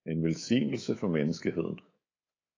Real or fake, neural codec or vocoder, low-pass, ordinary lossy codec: fake; codec, 24 kHz, 3.1 kbps, DualCodec; 7.2 kHz; AAC, 32 kbps